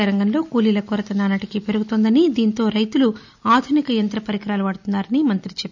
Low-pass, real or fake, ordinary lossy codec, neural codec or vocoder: 7.2 kHz; real; none; none